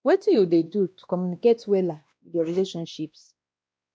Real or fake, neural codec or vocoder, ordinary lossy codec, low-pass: fake; codec, 16 kHz, 1 kbps, X-Codec, WavLM features, trained on Multilingual LibriSpeech; none; none